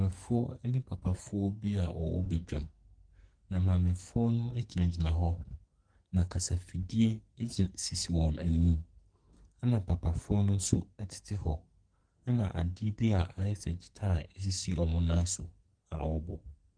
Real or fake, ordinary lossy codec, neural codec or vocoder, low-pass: fake; Opus, 24 kbps; codec, 44.1 kHz, 2.6 kbps, SNAC; 9.9 kHz